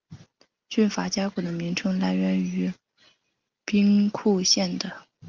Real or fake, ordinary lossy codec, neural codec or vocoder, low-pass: real; Opus, 32 kbps; none; 7.2 kHz